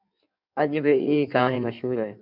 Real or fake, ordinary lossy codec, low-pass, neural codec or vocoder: fake; MP3, 48 kbps; 5.4 kHz; codec, 16 kHz in and 24 kHz out, 1.1 kbps, FireRedTTS-2 codec